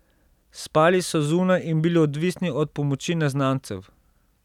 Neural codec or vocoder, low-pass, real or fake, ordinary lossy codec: none; 19.8 kHz; real; none